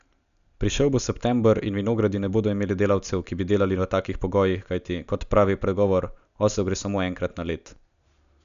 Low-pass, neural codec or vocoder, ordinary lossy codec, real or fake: 7.2 kHz; none; none; real